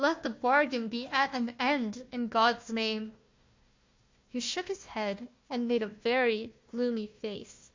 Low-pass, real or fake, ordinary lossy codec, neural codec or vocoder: 7.2 kHz; fake; MP3, 48 kbps; codec, 16 kHz, 1 kbps, FunCodec, trained on Chinese and English, 50 frames a second